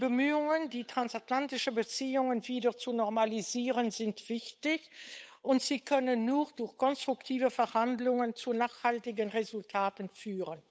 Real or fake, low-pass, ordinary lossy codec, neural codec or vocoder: fake; none; none; codec, 16 kHz, 8 kbps, FunCodec, trained on Chinese and English, 25 frames a second